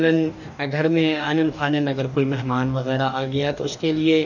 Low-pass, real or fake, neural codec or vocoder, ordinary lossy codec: 7.2 kHz; fake; codec, 44.1 kHz, 2.6 kbps, DAC; none